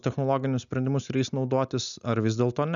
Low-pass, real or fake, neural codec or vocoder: 7.2 kHz; real; none